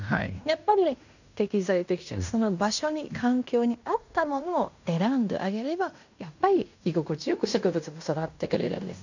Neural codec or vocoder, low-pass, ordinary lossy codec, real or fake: codec, 16 kHz in and 24 kHz out, 0.9 kbps, LongCat-Audio-Codec, fine tuned four codebook decoder; 7.2 kHz; none; fake